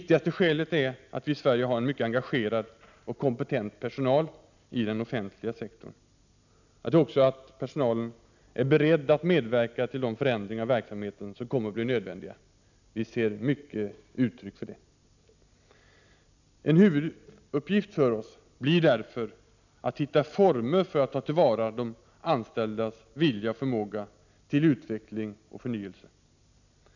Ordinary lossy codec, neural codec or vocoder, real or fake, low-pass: none; none; real; 7.2 kHz